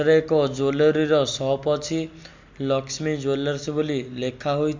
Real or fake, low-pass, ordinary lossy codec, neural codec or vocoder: real; 7.2 kHz; MP3, 64 kbps; none